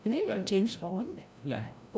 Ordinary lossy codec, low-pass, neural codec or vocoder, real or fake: none; none; codec, 16 kHz, 0.5 kbps, FreqCodec, larger model; fake